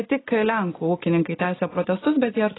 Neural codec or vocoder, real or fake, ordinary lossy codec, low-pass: none; real; AAC, 16 kbps; 7.2 kHz